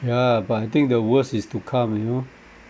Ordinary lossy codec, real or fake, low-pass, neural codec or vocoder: none; real; none; none